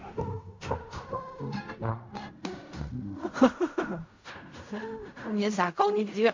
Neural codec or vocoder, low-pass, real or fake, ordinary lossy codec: codec, 16 kHz in and 24 kHz out, 0.4 kbps, LongCat-Audio-Codec, fine tuned four codebook decoder; 7.2 kHz; fake; MP3, 64 kbps